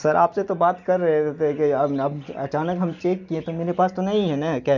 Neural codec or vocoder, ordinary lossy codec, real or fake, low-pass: none; none; real; 7.2 kHz